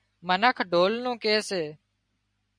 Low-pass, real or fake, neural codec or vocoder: 9.9 kHz; real; none